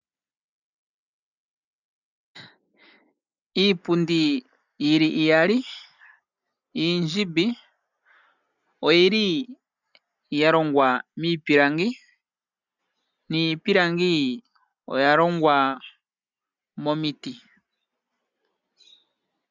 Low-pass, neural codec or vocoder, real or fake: 7.2 kHz; none; real